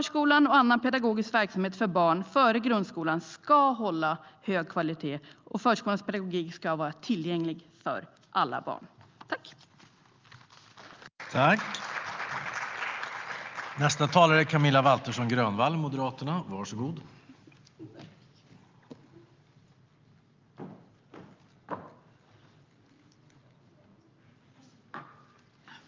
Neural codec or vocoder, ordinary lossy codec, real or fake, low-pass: none; Opus, 24 kbps; real; 7.2 kHz